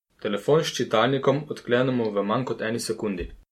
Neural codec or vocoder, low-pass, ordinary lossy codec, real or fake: none; 19.8 kHz; MP3, 64 kbps; real